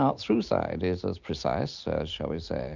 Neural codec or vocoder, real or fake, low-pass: none; real; 7.2 kHz